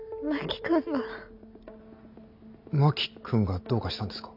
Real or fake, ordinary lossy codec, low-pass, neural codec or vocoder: real; none; 5.4 kHz; none